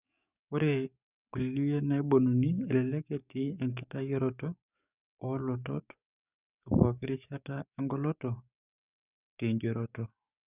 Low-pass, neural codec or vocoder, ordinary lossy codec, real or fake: 3.6 kHz; codec, 44.1 kHz, 7.8 kbps, DAC; none; fake